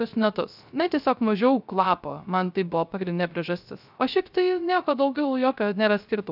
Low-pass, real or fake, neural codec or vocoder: 5.4 kHz; fake; codec, 16 kHz, 0.3 kbps, FocalCodec